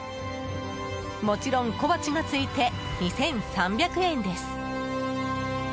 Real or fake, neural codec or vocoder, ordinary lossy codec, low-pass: real; none; none; none